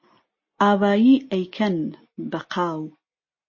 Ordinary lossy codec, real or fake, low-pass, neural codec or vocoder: MP3, 32 kbps; real; 7.2 kHz; none